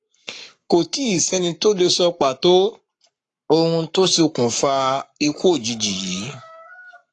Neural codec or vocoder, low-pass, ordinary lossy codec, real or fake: codec, 44.1 kHz, 7.8 kbps, Pupu-Codec; 10.8 kHz; AAC, 48 kbps; fake